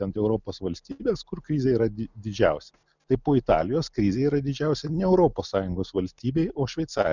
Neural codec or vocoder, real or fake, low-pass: none; real; 7.2 kHz